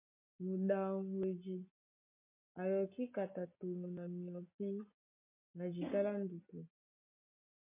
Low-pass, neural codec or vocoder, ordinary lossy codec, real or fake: 3.6 kHz; none; MP3, 32 kbps; real